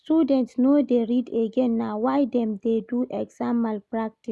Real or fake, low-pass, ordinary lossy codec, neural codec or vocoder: real; none; none; none